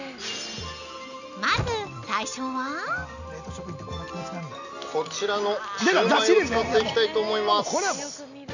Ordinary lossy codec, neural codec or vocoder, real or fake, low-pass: none; none; real; 7.2 kHz